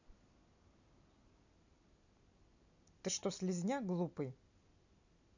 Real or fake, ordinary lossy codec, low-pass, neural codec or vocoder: real; none; 7.2 kHz; none